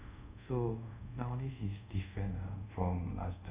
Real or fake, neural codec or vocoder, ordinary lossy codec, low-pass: fake; codec, 24 kHz, 0.5 kbps, DualCodec; Opus, 64 kbps; 3.6 kHz